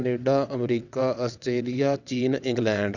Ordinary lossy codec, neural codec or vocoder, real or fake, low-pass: none; vocoder, 22.05 kHz, 80 mel bands, WaveNeXt; fake; 7.2 kHz